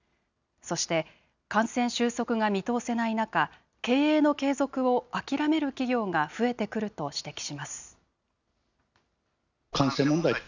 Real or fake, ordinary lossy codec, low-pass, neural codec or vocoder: real; none; 7.2 kHz; none